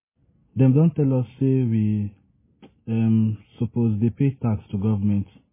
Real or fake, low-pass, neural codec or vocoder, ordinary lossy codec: real; 3.6 kHz; none; MP3, 16 kbps